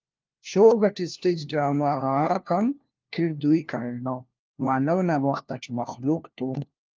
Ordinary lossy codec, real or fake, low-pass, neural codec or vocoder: Opus, 32 kbps; fake; 7.2 kHz; codec, 16 kHz, 1 kbps, FunCodec, trained on LibriTTS, 50 frames a second